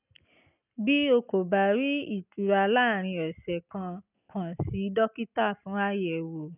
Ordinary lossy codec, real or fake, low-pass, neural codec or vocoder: none; real; 3.6 kHz; none